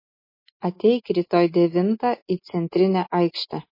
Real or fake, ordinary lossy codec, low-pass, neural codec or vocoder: real; MP3, 24 kbps; 5.4 kHz; none